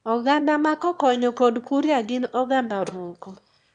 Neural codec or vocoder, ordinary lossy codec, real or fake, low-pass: autoencoder, 22.05 kHz, a latent of 192 numbers a frame, VITS, trained on one speaker; none; fake; 9.9 kHz